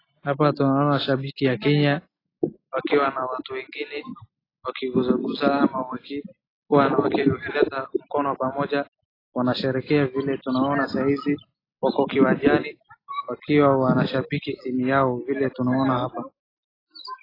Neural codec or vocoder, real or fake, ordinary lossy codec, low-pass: none; real; AAC, 24 kbps; 5.4 kHz